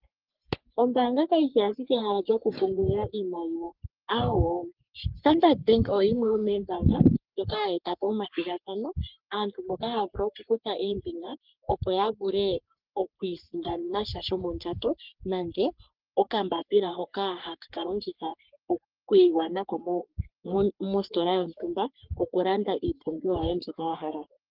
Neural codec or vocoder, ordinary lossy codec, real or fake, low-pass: codec, 44.1 kHz, 3.4 kbps, Pupu-Codec; Opus, 32 kbps; fake; 5.4 kHz